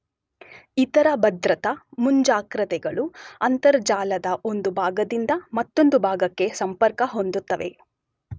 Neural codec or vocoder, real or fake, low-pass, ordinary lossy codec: none; real; none; none